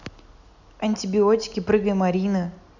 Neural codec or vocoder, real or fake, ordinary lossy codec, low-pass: none; real; none; 7.2 kHz